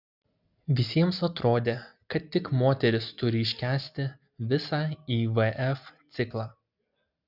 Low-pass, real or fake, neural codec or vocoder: 5.4 kHz; real; none